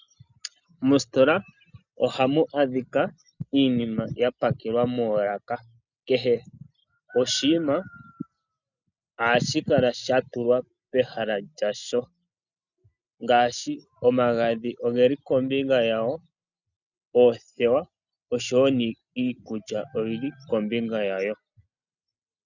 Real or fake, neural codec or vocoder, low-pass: real; none; 7.2 kHz